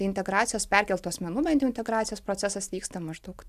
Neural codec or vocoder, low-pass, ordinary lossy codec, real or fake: none; 14.4 kHz; AAC, 96 kbps; real